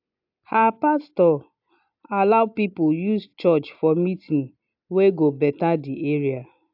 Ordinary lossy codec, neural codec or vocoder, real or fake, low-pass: AAC, 48 kbps; none; real; 5.4 kHz